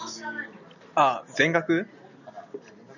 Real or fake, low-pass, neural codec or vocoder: real; 7.2 kHz; none